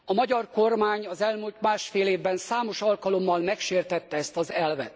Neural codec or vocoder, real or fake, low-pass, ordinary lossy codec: none; real; none; none